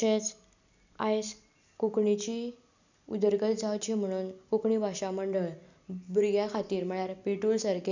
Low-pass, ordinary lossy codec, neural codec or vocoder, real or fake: 7.2 kHz; none; none; real